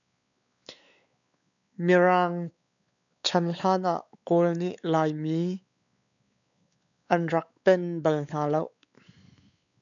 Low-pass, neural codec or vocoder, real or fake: 7.2 kHz; codec, 16 kHz, 4 kbps, X-Codec, WavLM features, trained on Multilingual LibriSpeech; fake